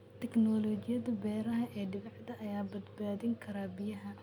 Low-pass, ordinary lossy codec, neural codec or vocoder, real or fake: 19.8 kHz; none; none; real